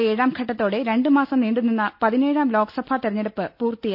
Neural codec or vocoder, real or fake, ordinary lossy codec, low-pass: none; real; none; 5.4 kHz